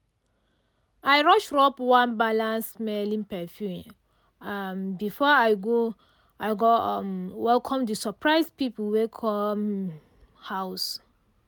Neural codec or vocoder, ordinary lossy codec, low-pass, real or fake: none; none; none; real